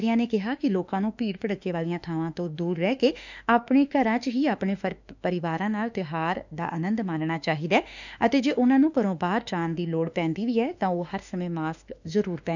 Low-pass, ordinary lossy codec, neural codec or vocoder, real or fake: 7.2 kHz; none; autoencoder, 48 kHz, 32 numbers a frame, DAC-VAE, trained on Japanese speech; fake